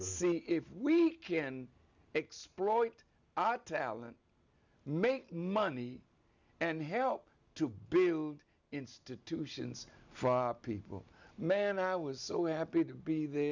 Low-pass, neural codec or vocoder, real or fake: 7.2 kHz; none; real